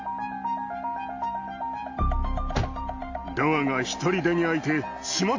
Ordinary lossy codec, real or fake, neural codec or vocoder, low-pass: AAC, 48 kbps; real; none; 7.2 kHz